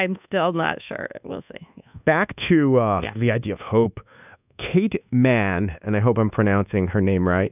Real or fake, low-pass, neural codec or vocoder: fake; 3.6 kHz; codec, 24 kHz, 1.2 kbps, DualCodec